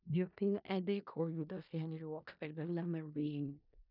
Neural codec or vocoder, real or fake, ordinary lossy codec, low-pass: codec, 16 kHz in and 24 kHz out, 0.4 kbps, LongCat-Audio-Codec, four codebook decoder; fake; none; 5.4 kHz